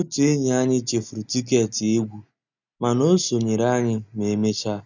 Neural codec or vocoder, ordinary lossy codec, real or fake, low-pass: none; none; real; 7.2 kHz